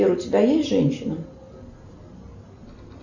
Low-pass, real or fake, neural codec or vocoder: 7.2 kHz; real; none